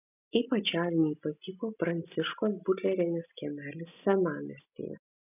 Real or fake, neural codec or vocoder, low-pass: real; none; 3.6 kHz